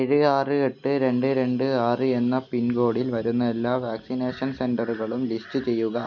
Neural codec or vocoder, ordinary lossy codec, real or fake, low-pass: none; none; real; 7.2 kHz